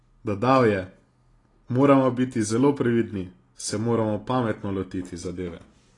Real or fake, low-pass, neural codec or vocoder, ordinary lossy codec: real; 10.8 kHz; none; AAC, 32 kbps